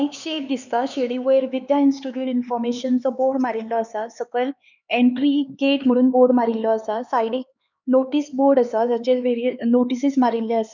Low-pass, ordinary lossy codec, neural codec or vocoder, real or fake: 7.2 kHz; none; codec, 16 kHz, 4 kbps, X-Codec, HuBERT features, trained on LibriSpeech; fake